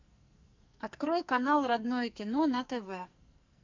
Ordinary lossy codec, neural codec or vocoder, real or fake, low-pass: MP3, 64 kbps; codec, 44.1 kHz, 2.6 kbps, SNAC; fake; 7.2 kHz